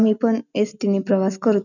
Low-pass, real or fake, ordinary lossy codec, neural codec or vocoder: 7.2 kHz; real; none; none